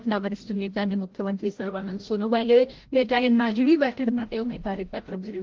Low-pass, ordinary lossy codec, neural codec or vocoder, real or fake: 7.2 kHz; Opus, 16 kbps; codec, 16 kHz, 0.5 kbps, FreqCodec, larger model; fake